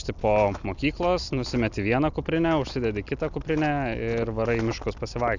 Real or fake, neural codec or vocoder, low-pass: real; none; 7.2 kHz